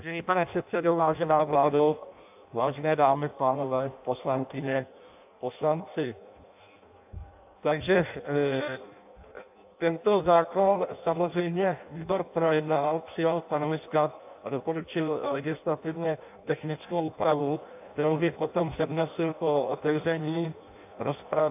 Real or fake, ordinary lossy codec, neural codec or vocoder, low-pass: fake; AAC, 32 kbps; codec, 16 kHz in and 24 kHz out, 0.6 kbps, FireRedTTS-2 codec; 3.6 kHz